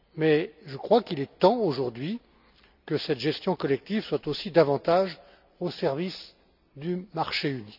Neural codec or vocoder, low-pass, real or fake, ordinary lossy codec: none; 5.4 kHz; real; none